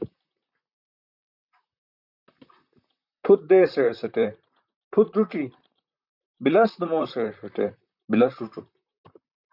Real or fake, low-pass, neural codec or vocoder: fake; 5.4 kHz; vocoder, 44.1 kHz, 128 mel bands, Pupu-Vocoder